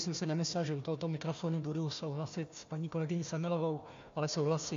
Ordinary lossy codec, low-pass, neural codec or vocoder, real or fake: MP3, 48 kbps; 7.2 kHz; codec, 16 kHz, 1 kbps, FunCodec, trained on Chinese and English, 50 frames a second; fake